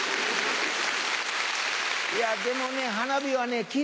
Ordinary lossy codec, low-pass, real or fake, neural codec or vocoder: none; none; real; none